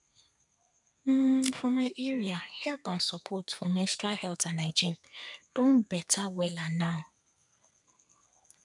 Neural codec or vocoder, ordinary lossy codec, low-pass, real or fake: codec, 32 kHz, 1.9 kbps, SNAC; none; 10.8 kHz; fake